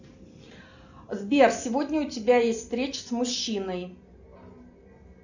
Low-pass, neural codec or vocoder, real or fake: 7.2 kHz; none; real